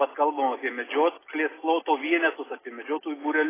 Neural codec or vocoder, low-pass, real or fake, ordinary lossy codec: none; 3.6 kHz; real; AAC, 16 kbps